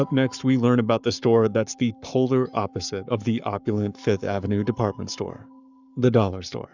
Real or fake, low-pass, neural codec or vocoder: fake; 7.2 kHz; codec, 44.1 kHz, 7.8 kbps, Pupu-Codec